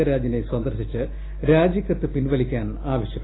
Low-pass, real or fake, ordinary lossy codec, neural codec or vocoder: 7.2 kHz; real; AAC, 16 kbps; none